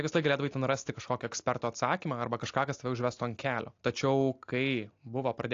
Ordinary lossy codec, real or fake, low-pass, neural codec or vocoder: AAC, 48 kbps; real; 7.2 kHz; none